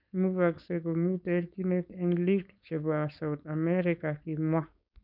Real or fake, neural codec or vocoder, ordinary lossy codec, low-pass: fake; codec, 16 kHz, 4.8 kbps, FACodec; none; 5.4 kHz